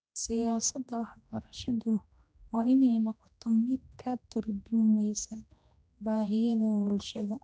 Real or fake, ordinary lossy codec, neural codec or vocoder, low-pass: fake; none; codec, 16 kHz, 1 kbps, X-Codec, HuBERT features, trained on general audio; none